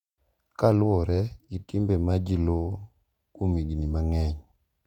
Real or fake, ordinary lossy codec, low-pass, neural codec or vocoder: fake; none; 19.8 kHz; vocoder, 48 kHz, 128 mel bands, Vocos